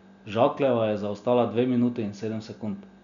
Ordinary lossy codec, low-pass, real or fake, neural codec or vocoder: none; 7.2 kHz; real; none